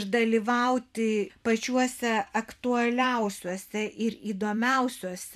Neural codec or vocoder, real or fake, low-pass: vocoder, 44.1 kHz, 128 mel bands every 256 samples, BigVGAN v2; fake; 14.4 kHz